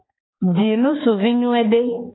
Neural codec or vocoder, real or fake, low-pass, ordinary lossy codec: codec, 16 kHz, 4 kbps, X-Codec, HuBERT features, trained on balanced general audio; fake; 7.2 kHz; AAC, 16 kbps